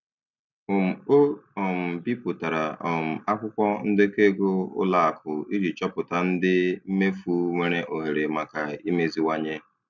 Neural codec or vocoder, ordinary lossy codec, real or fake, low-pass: none; none; real; 7.2 kHz